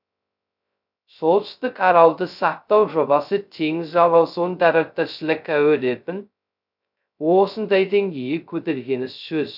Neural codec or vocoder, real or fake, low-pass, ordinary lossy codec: codec, 16 kHz, 0.2 kbps, FocalCodec; fake; 5.4 kHz; none